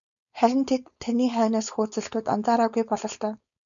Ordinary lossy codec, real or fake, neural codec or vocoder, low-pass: MP3, 96 kbps; fake; codec, 16 kHz, 4.8 kbps, FACodec; 7.2 kHz